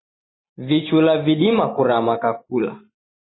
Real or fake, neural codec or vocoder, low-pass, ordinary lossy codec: real; none; 7.2 kHz; AAC, 16 kbps